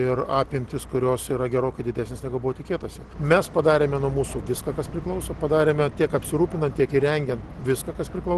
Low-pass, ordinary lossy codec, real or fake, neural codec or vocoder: 10.8 kHz; Opus, 16 kbps; real; none